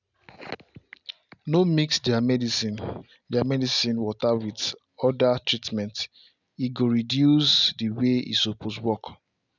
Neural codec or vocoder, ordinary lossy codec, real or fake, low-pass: none; none; real; 7.2 kHz